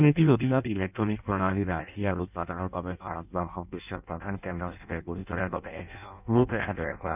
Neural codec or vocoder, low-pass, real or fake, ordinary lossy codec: codec, 16 kHz in and 24 kHz out, 0.6 kbps, FireRedTTS-2 codec; 3.6 kHz; fake; none